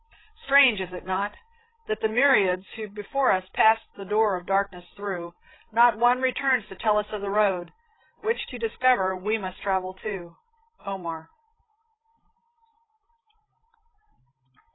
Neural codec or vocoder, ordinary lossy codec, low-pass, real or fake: codec, 16 kHz, 16 kbps, FreqCodec, larger model; AAC, 16 kbps; 7.2 kHz; fake